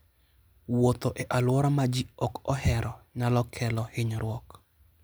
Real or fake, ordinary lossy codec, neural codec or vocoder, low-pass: real; none; none; none